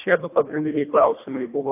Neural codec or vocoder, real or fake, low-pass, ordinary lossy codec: codec, 24 kHz, 1.5 kbps, HILCodec; fake; 3.6 kHz; AAC, 24 kbps